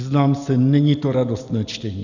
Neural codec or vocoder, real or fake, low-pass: none; real; 7.2 kHz